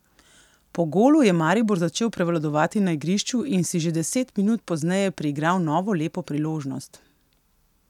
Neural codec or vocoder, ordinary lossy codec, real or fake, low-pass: none; none; real; 19.8 kHz